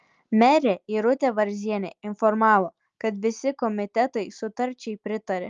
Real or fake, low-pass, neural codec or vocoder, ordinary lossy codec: real; 7.2 kHz; none; Opus, 32 kbps